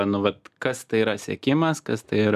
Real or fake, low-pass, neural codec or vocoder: real; 14.4 kHz; none